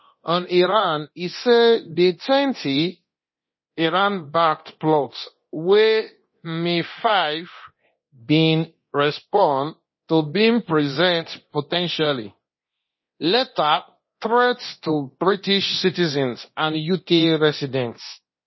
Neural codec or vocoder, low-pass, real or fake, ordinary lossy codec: codec, 24 kHz, 0.9 kbps, DualCodec; 7.2 kHz; fake; MP3, 24 kbps